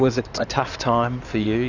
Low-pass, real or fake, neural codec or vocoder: 7.2 kHz; fake; codec, 16 kHz in and 24 kHz out, 2.2 kbps, FireRedTTS-2 codec